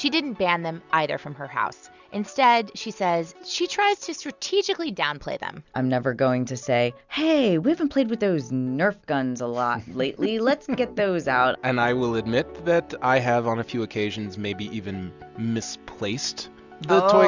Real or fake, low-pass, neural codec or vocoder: real; 7.2 kHz; none